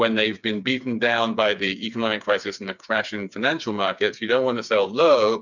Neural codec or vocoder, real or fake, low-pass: codec, 16 kHz, 4 kbps, FreqCodec, smaller model; fake; 7.2 kHz